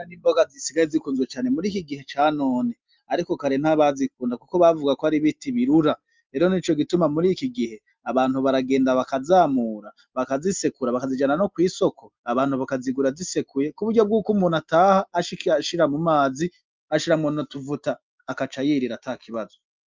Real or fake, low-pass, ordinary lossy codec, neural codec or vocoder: real; 7.2 kHz; Opus, 24 kbps; none